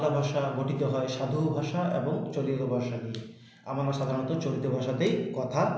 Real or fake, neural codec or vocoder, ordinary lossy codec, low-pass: real; none; none; none